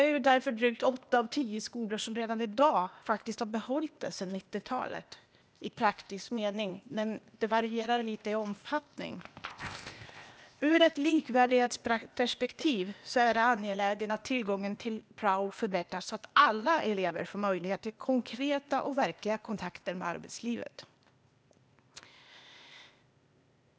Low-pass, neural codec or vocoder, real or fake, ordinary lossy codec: none; codec, 16 kHz, 0.8 kbps, ZipCodec; fake; none